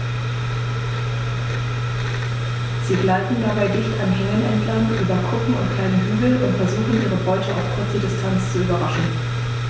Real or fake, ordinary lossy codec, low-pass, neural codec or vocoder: real; none; none; none